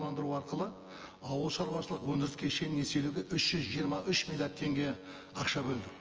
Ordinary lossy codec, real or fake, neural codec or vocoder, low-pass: Opus, 24 kbps; fake; vocoder, 24 kHz, 100 mel bands, Vocos; 7.2 kHz